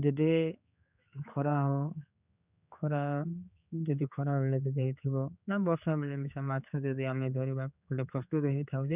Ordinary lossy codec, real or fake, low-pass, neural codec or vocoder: none; fake; 3.6 kHz; codec, 16 kHz, 4 kbps, X-Codec, HuBERT features, trained on general audio